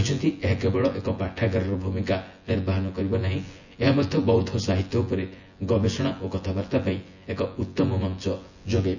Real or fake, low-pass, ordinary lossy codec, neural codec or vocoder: fake; 7.2 kHz; none; vocoder, 24 kHz, 100 mel bands, Vocos